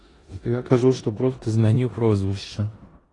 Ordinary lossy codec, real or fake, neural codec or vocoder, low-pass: AAC, 32 kbps; fake; codec, 16 kHz in and 24 kHz out, 0.9 kbps, LongCat-Audio-Codec, four codebook decoder; 10.8 kHz